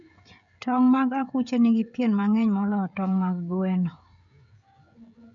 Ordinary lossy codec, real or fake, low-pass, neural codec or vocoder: none; fake; 7.2 kHz; codec, 16 kHz, 16 kbps, FreqCodec, smaller model